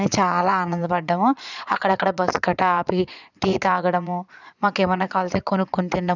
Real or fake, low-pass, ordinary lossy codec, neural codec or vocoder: fake; 7.2 kHz; none; vocoder, 22.05 kHz, 80 mel bands, Vocos